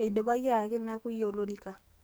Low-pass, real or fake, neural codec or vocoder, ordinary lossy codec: none; fake; codec, 44.1 kHz, 2.6 kbps, SNAC; none